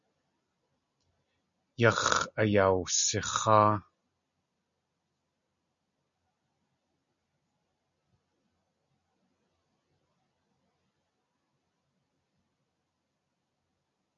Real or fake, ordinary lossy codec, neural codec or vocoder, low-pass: real; MP3, 64 kbps; none; 7.2 kHz